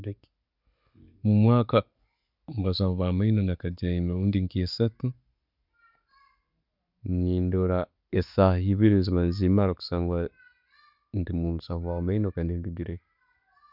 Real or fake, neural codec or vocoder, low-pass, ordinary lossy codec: real; none; 5.4 kHz; none